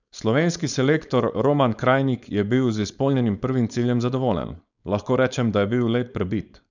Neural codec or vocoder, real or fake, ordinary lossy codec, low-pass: codec, 16 kHz, 4.8 kbps, FACodec; fake; none; 7.2 kHz